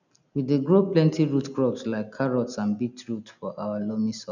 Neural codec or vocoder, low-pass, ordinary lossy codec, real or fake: none; 7.2 kHz; none; real